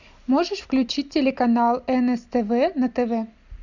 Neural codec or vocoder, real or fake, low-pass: none; real; 7.2 kHz